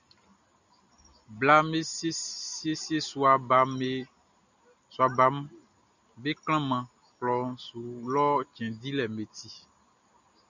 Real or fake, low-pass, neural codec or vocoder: real; 7.2 kHz; none